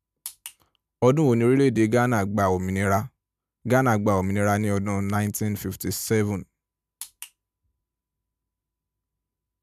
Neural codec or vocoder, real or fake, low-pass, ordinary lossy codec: none; real; 14.4 kHz; none